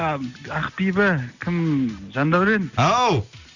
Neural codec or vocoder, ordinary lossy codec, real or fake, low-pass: none; none; real; 7.2 kHz